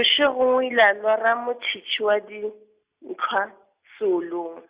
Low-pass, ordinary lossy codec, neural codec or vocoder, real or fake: 3.6 kHz; Opus, 64 kbps; none; real